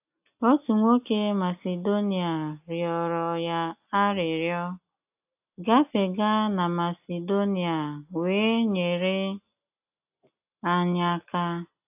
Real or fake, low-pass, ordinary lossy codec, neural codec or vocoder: real; 3.6 kHz; AAC, 32 kbps; none